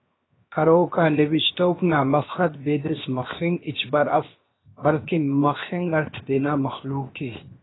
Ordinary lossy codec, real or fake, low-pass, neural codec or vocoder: AAC, 16 kbps; fake; 7.2 kHz; codec, 16 kHz, 0.7 kbps, FocalCodec